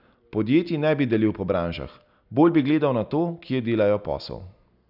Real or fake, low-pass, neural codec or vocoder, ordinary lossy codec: real; 5.4 kHz; none; none